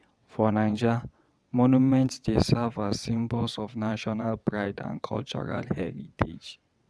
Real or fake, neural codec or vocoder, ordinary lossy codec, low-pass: fake; vocoder, 22.05 kHz, 80 mel bands, WaveNeXt; none; none